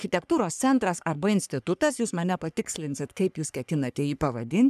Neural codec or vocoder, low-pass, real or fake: codec, 44.1 kHz, 3.4 kbps, Pupu-Codec; 14.4 kHz; fake